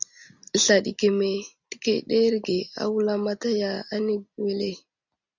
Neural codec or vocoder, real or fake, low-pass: none; real; 7.2 kHz